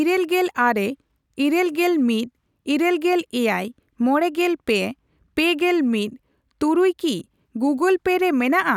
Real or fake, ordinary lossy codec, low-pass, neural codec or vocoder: real; none; 19.8 kHz; none